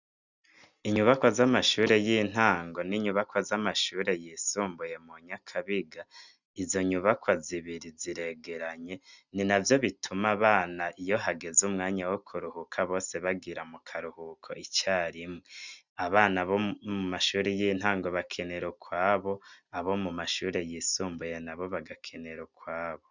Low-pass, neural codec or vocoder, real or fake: 7.2 kHz; none; real